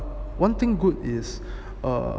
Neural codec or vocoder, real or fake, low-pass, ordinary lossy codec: none; real; none; none